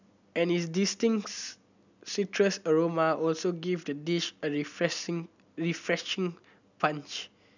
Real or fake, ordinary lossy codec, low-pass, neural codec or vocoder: real; none; 7.2 kHz; none